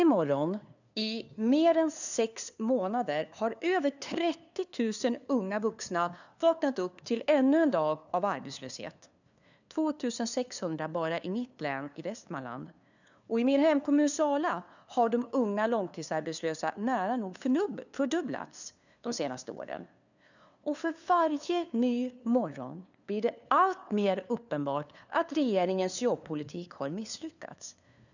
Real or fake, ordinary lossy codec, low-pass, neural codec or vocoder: fake; none; 7.2 kHz; codec, 16 kHz, 2 kbps, FunCodec, trained on LibriTTS, 25 frames a second